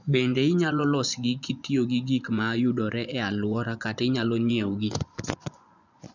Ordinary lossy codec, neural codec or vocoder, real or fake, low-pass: none; vocoder, 24 kHz, 100 mel bands, Vocos; fake; 7.2 kHz